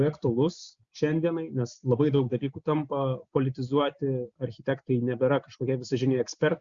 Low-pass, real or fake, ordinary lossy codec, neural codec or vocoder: 7.2 kHz; real; Opus, 64 kbps; none